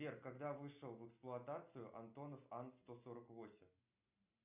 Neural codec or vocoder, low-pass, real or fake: none; 3.6 kHz; real